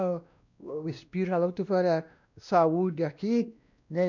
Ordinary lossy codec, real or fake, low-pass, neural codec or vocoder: none; fake; 7.2 kHz; codec, 16 kHz, 1 kbps, X-Codec, WavLM features, trained on Multilingual LibriSpeech